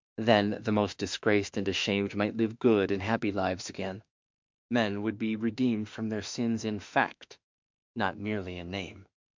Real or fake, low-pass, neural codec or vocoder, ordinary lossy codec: fake; 7.2 kHz; autoencoder, 48 kHz, 32 numbers a frame, DAC-VAE, trained on Japanese speech; MP3, 64 kbps